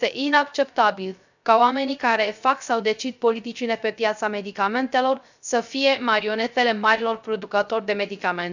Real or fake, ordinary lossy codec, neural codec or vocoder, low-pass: fake; none; codec, 16 kHz, 0.3 kbps, FocalCodec; 7.2 kHz